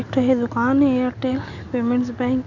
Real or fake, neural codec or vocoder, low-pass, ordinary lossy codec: real; none; 7.2 kHz; none